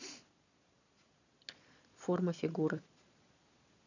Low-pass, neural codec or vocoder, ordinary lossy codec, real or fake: 7.2 kHz; vocoder, 22.05 kHz, 80 mel bands, Vocos; none; fake